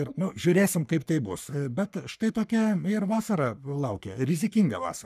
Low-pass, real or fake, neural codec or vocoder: 14.4 kHz; fake; codec, 44.1 kHz, 3.4 kbps, Pupu-Codec